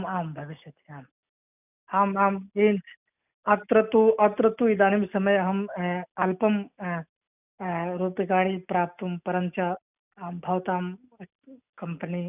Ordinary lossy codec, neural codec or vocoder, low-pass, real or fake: none; none; 3.6 kHz; real